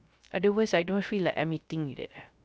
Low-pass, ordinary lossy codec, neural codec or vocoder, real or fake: none; none; codec, 16 kHz, 0.3 kbps, FocalCodec; fake